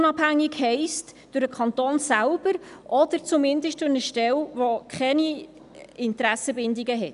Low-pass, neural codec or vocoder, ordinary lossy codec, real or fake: 10.8 kHz; none; none; real